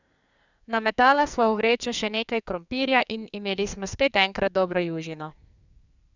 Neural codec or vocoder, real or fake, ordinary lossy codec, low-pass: codec, 44.1 kHz, 2.6 kbps, SNAC; fake; none; 7.2 kHz